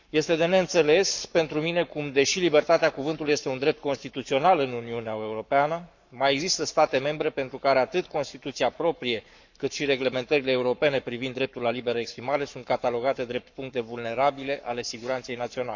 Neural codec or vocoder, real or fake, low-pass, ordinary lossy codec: codec, 44.1 kHz, 7.8 kbps, Pupu-Codec; fake; 7.2 kHz; none